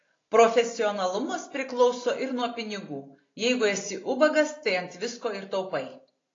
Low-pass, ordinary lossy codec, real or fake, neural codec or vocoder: 7.2 kHz; AAC, 32 kbps; real; none